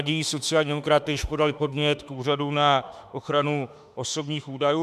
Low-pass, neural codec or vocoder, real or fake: 14.4 kHz; autoencoder, 48 kHz, 32 numbers a frame, DAC-VAE, trained on Japanese speech; fake